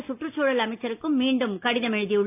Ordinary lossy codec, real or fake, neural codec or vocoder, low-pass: AAC, 32 kbps; real; none; 3.6 kHz